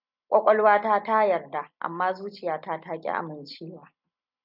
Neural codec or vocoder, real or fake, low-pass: none; real; 5.4 kHz